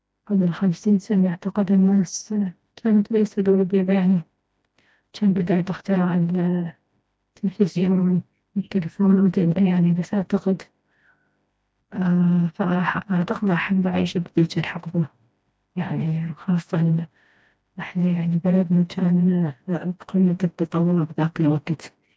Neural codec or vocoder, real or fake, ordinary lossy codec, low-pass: codec, 16 kHz, 1 kbps, FreqCodec, smaller model; fake; none; none